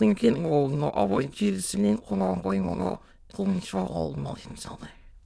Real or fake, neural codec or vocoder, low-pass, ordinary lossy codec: fake; autoencoder, 22.05 kHz, a latent of 192 numbers a frame, VITS, trained on many speakers; none; none